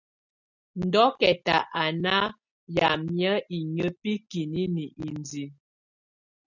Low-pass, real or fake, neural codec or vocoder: 7.2 kHz; real; none